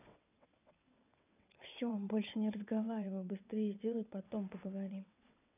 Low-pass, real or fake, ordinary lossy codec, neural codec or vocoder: 3.6 kHz; fake; none; vocoder, 22.05 kHz, 80 mel bands, WaveNeXt